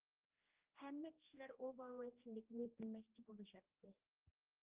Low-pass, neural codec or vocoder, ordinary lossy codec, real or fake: 3.6 kHz; codec, 16 kHz, 1 kbps, X-Codec, HuBERT features, trained on general audio; Opus, 24 kbps; fake